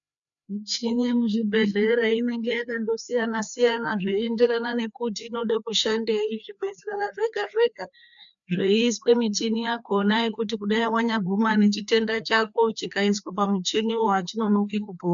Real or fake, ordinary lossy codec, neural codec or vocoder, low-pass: fake; MP3, 96 kbps; codec, 16 kHz, 2 kbps, FreqCodec, larger model; 7.2 kHz